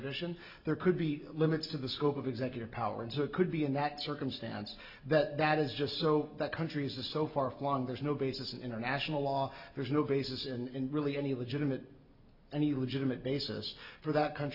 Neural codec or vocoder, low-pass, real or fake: none; 5.4 kHz; real